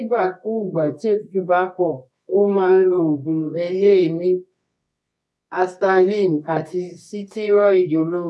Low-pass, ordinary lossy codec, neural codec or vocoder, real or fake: none; none; codec, 24 kHz, 0.9 kbps, WavTokenizer, medium music audio release; fake